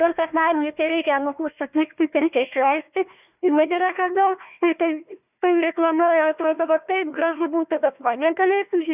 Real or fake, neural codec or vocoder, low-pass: fake; codec, 16 kHz, 1 kbps, FunCodec, trained on Chinese and English, 50 frames a second; 3.6 kHz